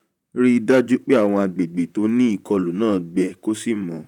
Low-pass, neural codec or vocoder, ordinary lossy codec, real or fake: 19.8 kHz; vocoder, 44.1 kHz, 128 mel bands, Pupu-Vocoder; none; fake